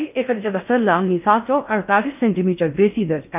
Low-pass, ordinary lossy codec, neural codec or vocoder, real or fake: 3.6 kHz; none; codec, 16 kHz in and 24 kHz out, 0.6 kbps, FocalCodec, streaming, 4096 codes; fake